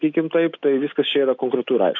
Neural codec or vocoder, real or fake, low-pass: none; real; 7.2 kHz